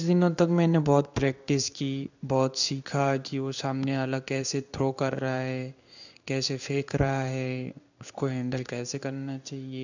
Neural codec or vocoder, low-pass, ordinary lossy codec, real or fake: codec, 16 kHz in and 24 kHz out, 1 kbps, XY-Tokenizer; 7.2 kHz; none; fake